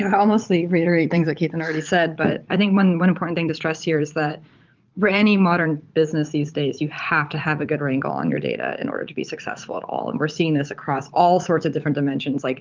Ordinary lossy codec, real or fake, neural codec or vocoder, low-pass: Opus, 24 kbps; fake; vocoder, 44.1 kHz, 80 mel bands, Vocos; 7.2 kHz